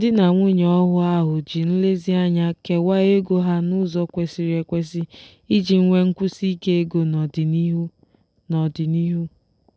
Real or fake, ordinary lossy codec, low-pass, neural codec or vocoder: real; none; none; none